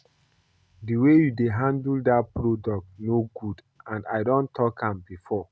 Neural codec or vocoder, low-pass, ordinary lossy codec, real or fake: none; none; none; real